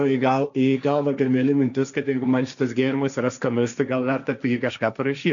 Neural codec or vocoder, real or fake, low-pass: codec, 16 kHz, 1.1 kbps, Voila-Tokenizer; fake; 7.2 kHz